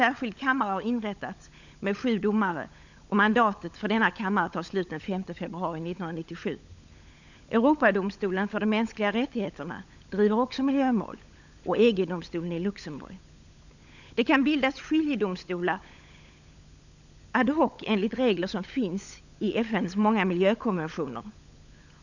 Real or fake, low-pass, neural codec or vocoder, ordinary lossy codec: fake; 7.2 kHz; codec, 16 kHz, 16 kbps, FunCodec, trained on LibriTTS, 50 frames a second; none